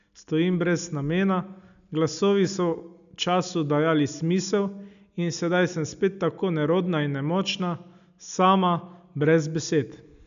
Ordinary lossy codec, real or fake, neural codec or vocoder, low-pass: none; real; none; 7.2 kHz